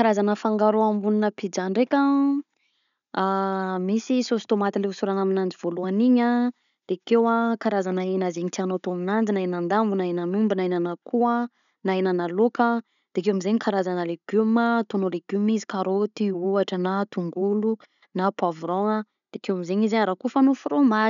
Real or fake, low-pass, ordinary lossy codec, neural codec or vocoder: real; 7.2 kHz; none; none